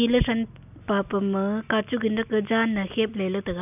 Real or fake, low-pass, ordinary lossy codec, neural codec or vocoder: real; 3.6 kHz; none; none